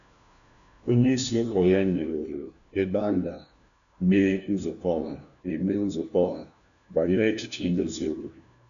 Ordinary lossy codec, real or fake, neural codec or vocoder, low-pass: none; fake; codec, 16 kHz, 1 kbps, FunCodec, trained on LibriTTS, 50 frames a second; 7.2 kHz